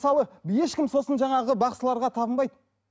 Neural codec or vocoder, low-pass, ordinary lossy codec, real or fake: none; none; none; real